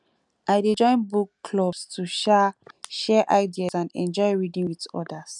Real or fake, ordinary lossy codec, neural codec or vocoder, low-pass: real; none; none; 10.8 kHz